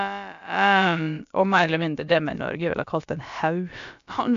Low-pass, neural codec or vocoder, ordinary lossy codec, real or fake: 7.2 kHz; codec, 16 kHz, about 1 kbps, DyCAST, with the encoder's durations; MP3, 64 kbps; fake